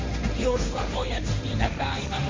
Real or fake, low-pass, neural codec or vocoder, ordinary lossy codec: fake; 7.2 kHz; codec, 16 kHz, 1.1 kbps, Voila-Tokenizer; MP3, 48 kbps